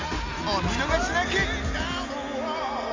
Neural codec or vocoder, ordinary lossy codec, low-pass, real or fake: none; MP3, 48 kbps; 7.2 kHz; real